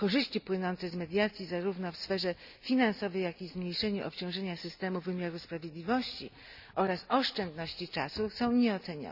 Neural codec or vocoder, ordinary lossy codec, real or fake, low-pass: none; none; real; 5.4 kHz